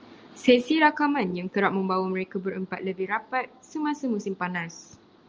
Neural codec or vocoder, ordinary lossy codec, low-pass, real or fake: none; Opus, 24 kbps; 7.2 kHz; real